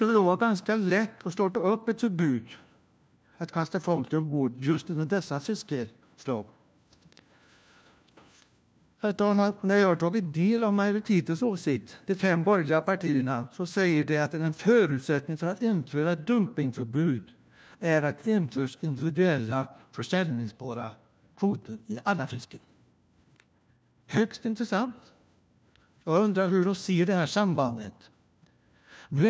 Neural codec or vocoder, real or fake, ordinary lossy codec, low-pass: codec, 16 kHz, 1 kbps, FunCodec, trained on LibriTTS, 50 frames a second; fake; none; none